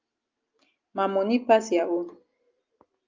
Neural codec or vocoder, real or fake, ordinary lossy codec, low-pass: none; real; Opus, 24 kbps; 7.2 kHz